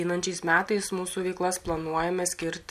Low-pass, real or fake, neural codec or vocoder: 14.4 kHz; real; none